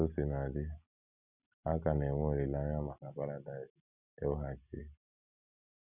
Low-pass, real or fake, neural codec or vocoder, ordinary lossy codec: 3.6 kHz; real; none; none